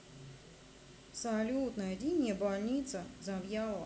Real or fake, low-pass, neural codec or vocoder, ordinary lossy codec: real; none; none; none